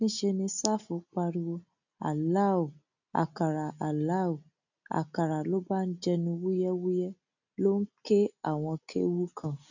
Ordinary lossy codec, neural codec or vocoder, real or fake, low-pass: none; none; real; 7.2 kHz